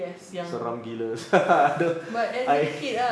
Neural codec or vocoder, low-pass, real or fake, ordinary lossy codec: none; none; real; none